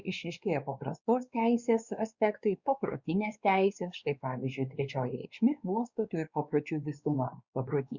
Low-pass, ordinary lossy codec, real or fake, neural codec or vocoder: 7.2 kHz; Opus, 64 kbps; fake; codec, 16 kHz, 2 kbps, X-Codec, WavLM features, trained on Multilingual LibriSpeech